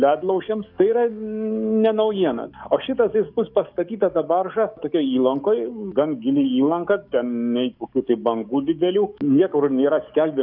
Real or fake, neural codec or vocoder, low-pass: fake; codec, 44.1 kHz, 7.8 kbps, DAC; 5.4 kHz